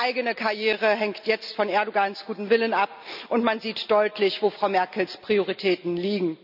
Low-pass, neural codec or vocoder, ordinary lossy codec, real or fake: 5.4 kHz; none; none; real